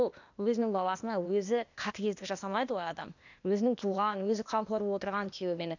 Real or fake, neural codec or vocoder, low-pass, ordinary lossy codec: fake; codec, 16 kHz, 0.8 kbps, ZipCodec; 7.2 kHz; none